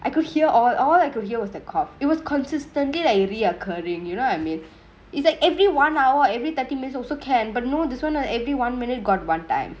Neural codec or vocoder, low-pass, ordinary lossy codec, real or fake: none; none; none; real